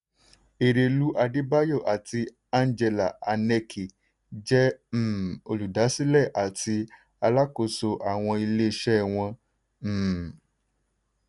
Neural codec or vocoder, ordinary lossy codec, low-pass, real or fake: none; none; 10.8 kHz; real